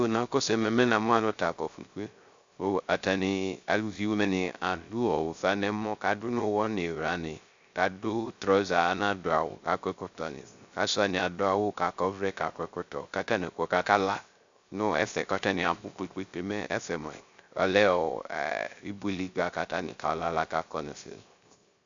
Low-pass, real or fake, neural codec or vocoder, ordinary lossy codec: 7.2 kHz; fake; codec, 16 kHz, 0.3 kbps, FocalCodec; MP3, 64 kbps